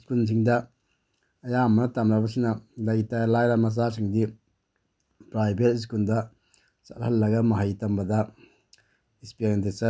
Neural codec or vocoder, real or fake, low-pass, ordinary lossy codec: none; real; none; none